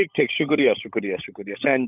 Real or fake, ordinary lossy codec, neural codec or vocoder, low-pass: fake; none; codec, 16 kHz, 16 kbps, FunCodec, trained on LibriTTS, 50 frames a second; 3.6 kHz